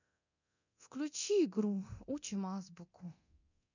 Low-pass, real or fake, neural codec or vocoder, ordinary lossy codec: 7.2 kHz; fake; codec, 24 kHz, 0.9 kbps, DualCodec; none